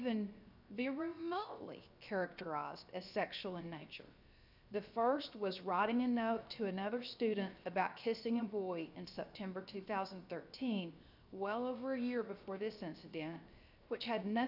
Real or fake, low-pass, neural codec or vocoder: fake; 5.4 kHz; codec, 16 kHz, 0.7 kbps, FocalCodec